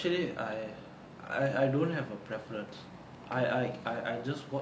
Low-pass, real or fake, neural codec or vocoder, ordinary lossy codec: none; real; none; none